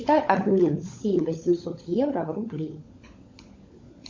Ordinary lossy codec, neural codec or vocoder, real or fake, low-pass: MP3, 48 kbps; codec, 16 kHz, 16 kbps, FunCodec, trained on LibriTTS, 50 frames a second; fake; 7.2 kHz